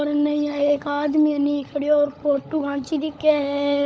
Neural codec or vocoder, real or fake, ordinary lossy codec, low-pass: codec, 16 kHz, 16 kbps, FunCodec, trained on LibriTTS, 50 frames a second; fake; none; none